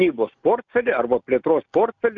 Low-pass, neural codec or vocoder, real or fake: 7.2 kHz; none; real